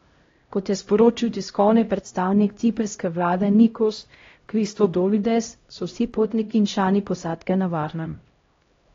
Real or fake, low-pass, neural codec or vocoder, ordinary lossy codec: fake; 7.2 kHz; codec, 16 kHz, 0.5 kbps, X-Codec, HuBERT features, trained on LibriSpeech; AAC, 32 kbps